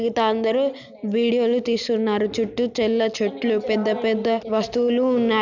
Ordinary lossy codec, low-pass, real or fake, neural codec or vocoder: none; 7.2 kHz; real; none